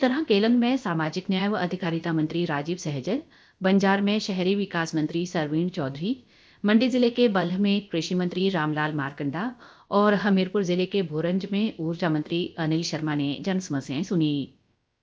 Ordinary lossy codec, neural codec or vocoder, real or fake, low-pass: none; codec, 16 kHz, about 1 kbps, DyCAST, with the encoder's durations; fake; none